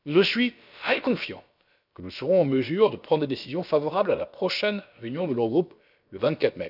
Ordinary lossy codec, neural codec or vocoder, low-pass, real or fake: none; codec, 16 kHz, about 1 kbps, DyCAST, with the encoder's durations; 5.4 kHz; fake